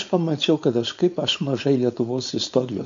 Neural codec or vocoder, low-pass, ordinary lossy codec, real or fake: codec, 16 kHz, 4.8 kbps, FACodec; 7.2 kHz; MP3, 48 kbps; fake